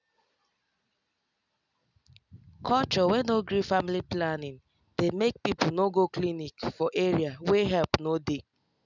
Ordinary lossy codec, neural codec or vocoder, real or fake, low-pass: none; none; real; 7.2 kHz